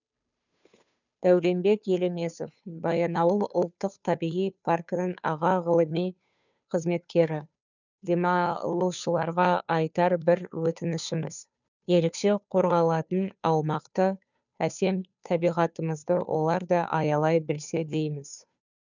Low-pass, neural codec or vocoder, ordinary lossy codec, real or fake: 7.2 kHz; codec, 16 kHz, 2 kbps, FunCodec, trained on Chinese and English, 25 frames a second; none; fake